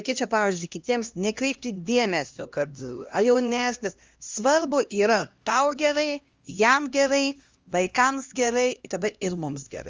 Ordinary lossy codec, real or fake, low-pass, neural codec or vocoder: Opus, 32 kbps; fake; 7.2 kHz; codec, 16 kHz, 1 kbps, X-Codec, HuBERT features, trained on LibriSpeech